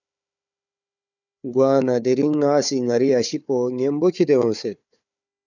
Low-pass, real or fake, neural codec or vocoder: 7.2 kHz; fake; codec, 16 kHz, 4 kbps, FunCodec, trained on Chinese and English, 50 frames a second